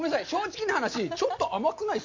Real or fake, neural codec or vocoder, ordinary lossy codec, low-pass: real; none; MP3, 48 kbps; 7.2 kHz